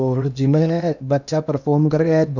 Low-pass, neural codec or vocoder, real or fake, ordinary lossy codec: 7.2 kHz; codec, 16 kHz in and 24 kHz out, 0.8 kbps, FocalCodec, streaming, 65536 codes; fake; none